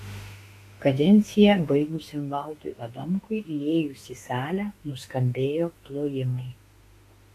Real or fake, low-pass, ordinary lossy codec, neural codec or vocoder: fake; 14.4 kHz; AAC, 48 kbps; autoencoder, 48 kHz, 32 numbers a frame, DAC-VAE, trained on Japanese speech